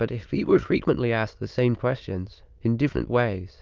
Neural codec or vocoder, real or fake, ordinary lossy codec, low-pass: autoencoder, 22.05 kHz, a latent of 192 numbers a frame, VITS, trained on many speakers; fake; Opus, 32 kbps; 7.2 kHz